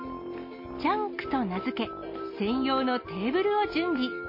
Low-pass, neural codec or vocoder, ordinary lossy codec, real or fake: 5.4 kHz; none; MP3, 24 kbps; real